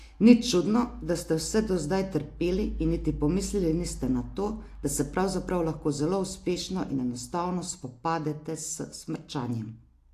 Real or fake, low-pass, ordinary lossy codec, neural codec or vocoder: real; 14.4 kHz; AAC, 64 kbps; none